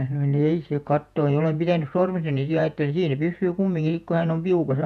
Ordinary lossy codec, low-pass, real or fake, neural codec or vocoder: none; 14.4 kHz; fake; vocoder, 48 kHz, 128 mel bands, Vocos